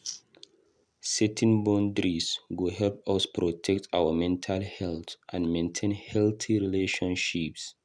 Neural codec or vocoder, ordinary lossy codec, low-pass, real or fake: none; none; none; real